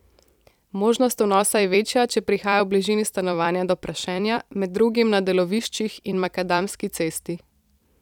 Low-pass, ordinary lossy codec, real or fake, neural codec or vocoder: 19.8 kHz; none; fake; vocoder, 44.1 kHz, 128 mel bands every 256 samples, BigVGAN v2